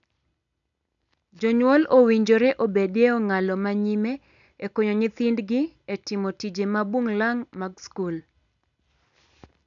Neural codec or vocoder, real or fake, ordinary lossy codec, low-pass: none; real; none; 7.2 kHz